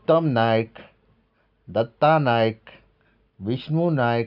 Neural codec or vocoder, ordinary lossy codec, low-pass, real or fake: none; none; 5.4 kHz; real